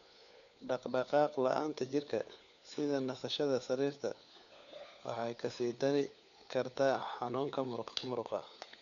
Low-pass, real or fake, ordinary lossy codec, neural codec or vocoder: 7.2 kHz; fake; none; codec, 16 kHz, 4 kbps, FunCodec, trained on LibriTTS, 50 frames a second